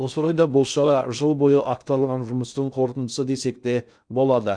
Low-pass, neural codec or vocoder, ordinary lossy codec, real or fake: 9.9 kHz; codec, 16 kHz in and 24 kHz out, 0.6 kbps, FocalCodec, streaming, 2048 codes; none; fake